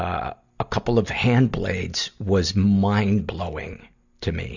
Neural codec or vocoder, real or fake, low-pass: none; real; 7.2 kHz